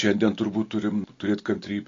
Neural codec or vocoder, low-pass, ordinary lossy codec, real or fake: none; 7.2 kHz; AAC, 32 kbps; real